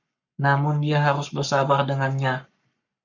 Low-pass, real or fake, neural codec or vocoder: 7.2 kHz; fake; codec, 44.1 kHz, 7.8 kbps, Pupu-Codec